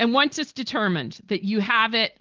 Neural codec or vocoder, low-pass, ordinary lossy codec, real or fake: none; 7.2 kHz; Opus, 16 kbps; real